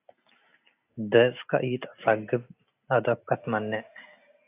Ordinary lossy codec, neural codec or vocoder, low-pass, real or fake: AAC, 24 kbps; none; 3.6 kHz; real